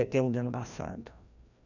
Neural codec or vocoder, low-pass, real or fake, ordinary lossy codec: codec, 16 kHz, 1 kbps, FreqCodec, larger model; 7.2 kHz; fake; none